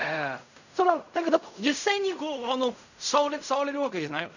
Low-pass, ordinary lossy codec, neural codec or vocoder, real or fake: 7.2 kHz; none; codec, 16 kHz in and 24 kHz out, 0.4 kbps, LongCat-Audio-Codec, fine tuned four codebook decoder; fake